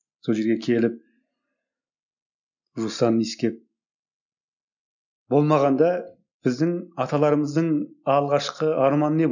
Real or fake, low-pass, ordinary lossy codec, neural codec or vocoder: real; 7.2 kHz; none; none